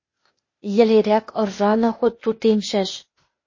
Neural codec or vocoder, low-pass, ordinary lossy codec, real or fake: codec, 16 kHz, 0.8 kbps, ZipCodec; 7.2 kHz; MP3, 32 kbps; fake